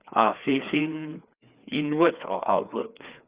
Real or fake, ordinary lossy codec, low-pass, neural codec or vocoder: fake; Opus, 64 kbps; 3.6 kHz; codec, 16 kHz, 2 kbps, FreqCodec, larger model